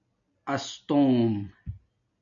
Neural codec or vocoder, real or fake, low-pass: none; real; 7.2 kHz